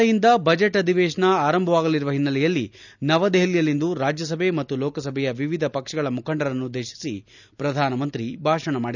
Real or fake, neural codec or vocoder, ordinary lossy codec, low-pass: real; none; none; 7.2 kHz